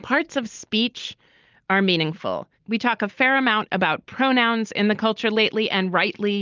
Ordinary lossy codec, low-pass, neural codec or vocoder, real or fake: Opus, 24 kbps; 7.2 kHz; none; real